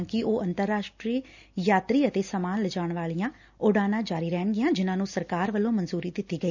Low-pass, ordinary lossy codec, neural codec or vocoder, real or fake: 7.2 kHz; none; none; real